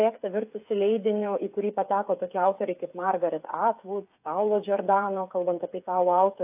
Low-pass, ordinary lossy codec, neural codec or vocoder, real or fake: 3.6 kHz; AAC, 32 kbps; codec, 16 kHz, 8 kbps, FreqCodec, smaller model; fake